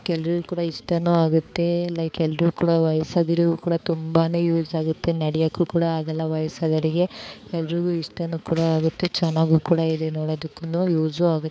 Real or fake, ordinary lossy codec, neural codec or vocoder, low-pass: fake; none; codec, 16 kHz, 4 kbps, X-Codec, HuBERT features, trained on balanced general audio; none